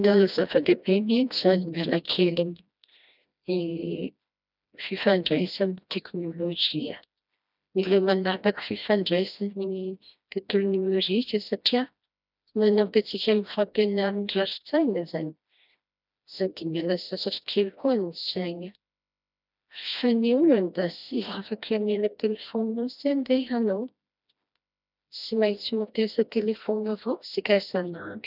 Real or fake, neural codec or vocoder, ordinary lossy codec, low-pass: fake; codec, 16 kHz, 1 kbps, FreqCodec, smaller model; none; 5.4 kHz